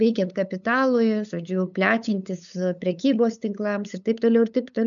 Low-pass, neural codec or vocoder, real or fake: 7.2 kHz; codec, 16 kHz, 8 kbps, FunCodec, trained on LibriTTS, 25 frames a second; fake